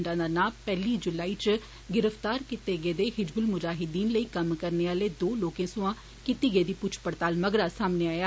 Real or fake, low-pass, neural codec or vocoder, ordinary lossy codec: real; none; none; none